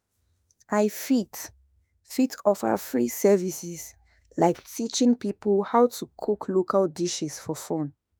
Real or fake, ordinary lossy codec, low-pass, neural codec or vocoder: fake; none; none; autoencoder, 48 kHz, 32 numbers a frame, DAC-VAE, trained on Japanese speech